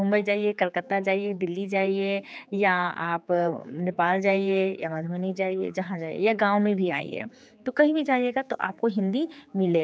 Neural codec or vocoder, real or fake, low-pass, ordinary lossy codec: codec, 16 kHz, 4 kbps, X-Codec, HuBERT features, trained on general audio; fake; none; none